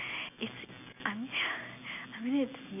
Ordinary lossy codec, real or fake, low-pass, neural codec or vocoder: none; real; 3.6 kHz; none